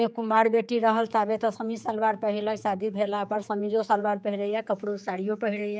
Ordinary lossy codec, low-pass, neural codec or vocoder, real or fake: none; none; codec, 16 kHz, 4 kbps, X-Codec, HuBERT features, trained on general audio; fake